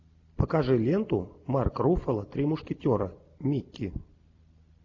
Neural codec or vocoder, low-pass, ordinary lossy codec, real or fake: none; 7.2 kHz; AAC, 48 kbps; real